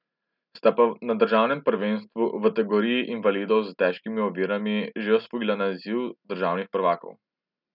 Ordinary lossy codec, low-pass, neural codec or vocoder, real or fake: none; 5.4 kHz; none; real